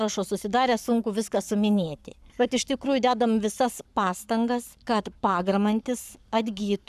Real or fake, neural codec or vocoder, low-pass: fake; codec, 44.1 kHz, 7.8 kbps, Pupu-Codec; 14.4 kHz